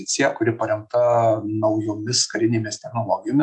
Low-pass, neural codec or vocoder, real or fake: 10.8 kHz; none; real